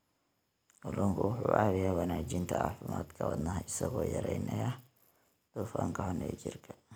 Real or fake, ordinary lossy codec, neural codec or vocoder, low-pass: real; none; none; none